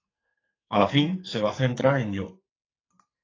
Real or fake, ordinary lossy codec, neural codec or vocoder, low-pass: fake; AAC, 32 kbps; codec, 44.1 kHz, 2.6 kbps, SNAC; 7.2 kHz